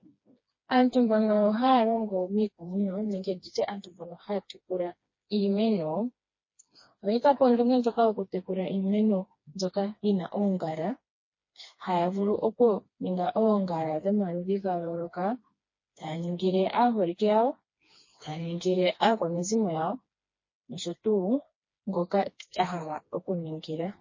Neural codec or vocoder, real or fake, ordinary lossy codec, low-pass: codec, 16 kHz, 2 kbps, FreqCodec, smaller model; fake; MP3, 32 kbps; 7.2 kHz